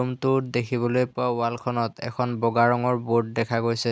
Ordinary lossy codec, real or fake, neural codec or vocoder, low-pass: none; real; none; none